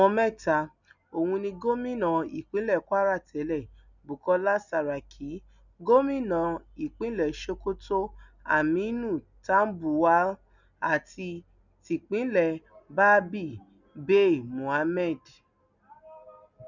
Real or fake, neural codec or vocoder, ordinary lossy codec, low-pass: real; none; none; 7.2 kHz